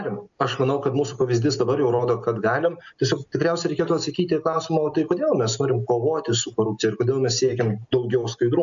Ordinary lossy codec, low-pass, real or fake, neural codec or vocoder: MP3, 96 kbps; 7.2 kHz; real; none